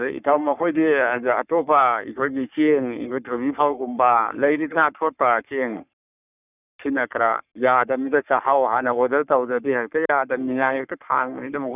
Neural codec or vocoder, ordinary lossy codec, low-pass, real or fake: codec, 44.1 kHz, 3.4 kbps, Pupu-Codec; none; 3.6 kHz; fake